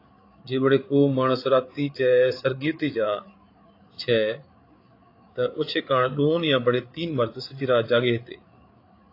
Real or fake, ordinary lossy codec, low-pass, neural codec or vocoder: fake; AAC, 32 kbps; 5.4 kHz; codec, 16 kHz, 8 kbps, FreqCodec, larger model